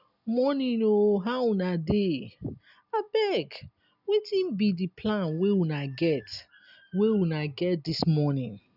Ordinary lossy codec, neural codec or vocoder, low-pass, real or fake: none; none; 5.4 kHz; real